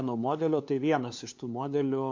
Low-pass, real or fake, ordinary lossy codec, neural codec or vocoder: 7.2 kHz; fake; MP3, 48 kbps; codec, 16 kHz, 2 kbps, FunCodec, trained on LibriTTS, 25 frames a second